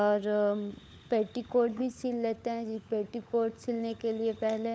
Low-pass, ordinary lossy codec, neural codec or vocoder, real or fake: none; none; codec, 16 kHz, 16 kbps, FunCodec, trained on LibriTTS, 50 frames a second; fake